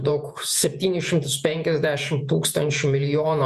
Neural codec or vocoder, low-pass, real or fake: vocoder, 48 kHz, 128 mel bands, Vocos; 14.4 kHz; fake